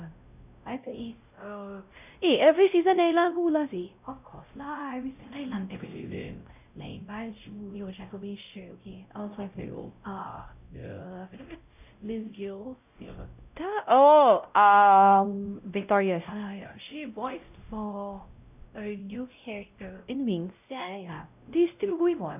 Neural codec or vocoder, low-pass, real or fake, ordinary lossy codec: codec, 16 kHz, 0.5 kbps, X-Codec, WavLM features, trained on Multilingual LibriSpeech; 3.6 kHz; fake; none